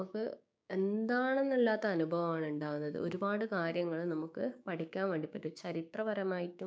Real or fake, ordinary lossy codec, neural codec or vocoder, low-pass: fake; none; codec, 16 kHz, 6 kbps, DAC; none